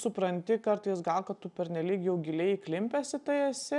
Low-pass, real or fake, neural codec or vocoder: 10.8 kHz; real; none